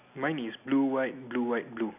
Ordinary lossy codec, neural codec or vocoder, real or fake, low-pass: none; none; real; 3.6 kHz